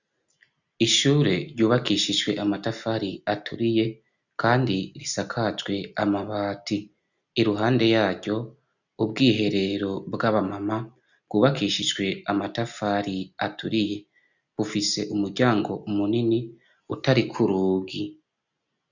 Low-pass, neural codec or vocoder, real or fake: 7.2 kHz; none; real